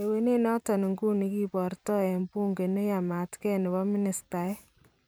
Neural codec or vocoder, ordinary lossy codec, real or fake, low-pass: vocoder, 44.1 kHz, 128 mel bands every 512 samples, BigVGAN v2; none; fake; none